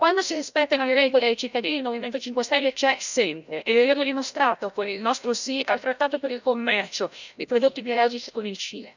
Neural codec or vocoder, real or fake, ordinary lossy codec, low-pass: codec, 16 kHz, 0.5 kbps, FreqCodec, larger model; fake; none; 7.2 kHz